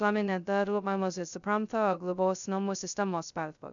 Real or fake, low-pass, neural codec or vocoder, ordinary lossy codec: fake; 7.2 kHz; codec, 16 kHz, 0.2 kbps, FocalCodec; MP3, 96 kbps